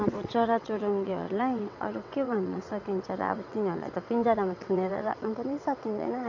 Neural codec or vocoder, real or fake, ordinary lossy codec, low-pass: vocoder, 22.05 kHz, 80 mel bands, WaveNeXt; fake; MP3, 64 kbps; 7.2 kHz